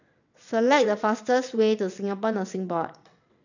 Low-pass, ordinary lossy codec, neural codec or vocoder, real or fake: 7.2 kHz; none; vocoder, 22.05 kHz, 80 mel bands, WaveNeXt; fake